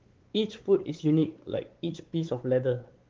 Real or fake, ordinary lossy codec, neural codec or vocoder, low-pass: fake; Opus, 16 kbps; codec, 16 kHz, 4 kbps, X-Codec, WavLM features, trained on Multilingual LibriSpeech; 7.2 kHz